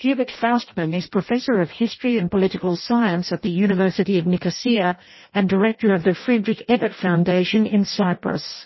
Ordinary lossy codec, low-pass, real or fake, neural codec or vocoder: MP3, 24 kbps; 7.2 kHz; fake; codec, 16 kHz in and 24 kHz out, 0.6 kbps, FireRedTTS-2 codec